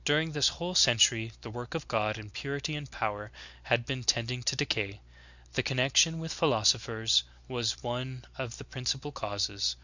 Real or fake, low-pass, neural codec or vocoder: real; 7.2 kHz; none